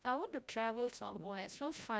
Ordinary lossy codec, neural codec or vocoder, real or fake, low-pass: none; codec, 16 kHz, 0.5 kbps, FreqCodec, larger model; fake; none